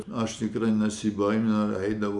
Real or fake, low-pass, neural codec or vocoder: real; 10.8 kHz; none